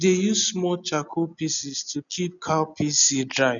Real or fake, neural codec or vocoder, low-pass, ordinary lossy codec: real; none; 7.2 kHz; none